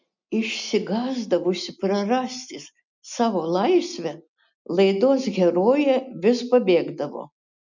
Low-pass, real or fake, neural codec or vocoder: 7.2 kHz; real; none